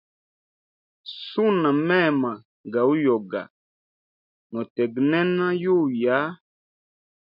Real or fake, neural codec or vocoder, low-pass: real; none; 5.4 kHz